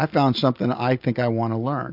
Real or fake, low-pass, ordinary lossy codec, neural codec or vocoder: real; 5.4 kHz; AAC, 48 kbps; none